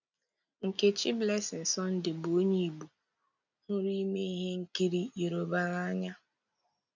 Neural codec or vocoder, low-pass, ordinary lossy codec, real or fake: none; 7.2 kHz; none; real